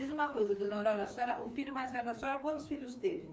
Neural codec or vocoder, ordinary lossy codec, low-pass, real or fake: codec, 16 kHz, 2 kbps, FreqCodec, larger model; none; none; fake